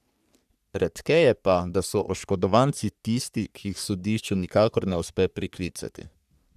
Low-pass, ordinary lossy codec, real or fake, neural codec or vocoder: 14.4 kHz; none; fake; codec, 44.1 kHz, 3.4 kbps, Pupu-Codec